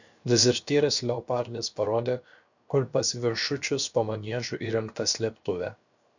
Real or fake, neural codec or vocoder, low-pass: fake; codec, 16 kHz, 0.7 kbps, FocalCodec; 7.2 kHz